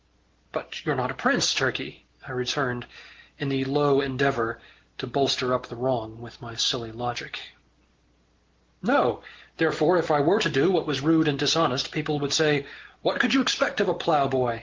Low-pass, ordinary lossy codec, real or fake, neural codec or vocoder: 7.2 kHz; Opus, 16 kbps; real; none